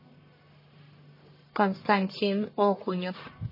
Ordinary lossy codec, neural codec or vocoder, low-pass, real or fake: MP3, 24 kbps; codec, 44.1 kHz, 1.7 kbps, Pupu-Codec; 5.4 kHz; fake